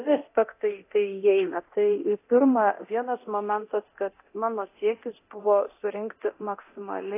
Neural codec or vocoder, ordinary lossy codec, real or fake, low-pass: codec, 24 kHz, 0.9 kbps, DualCodec; AAC, 24 kbps; fake; 3.6 kHz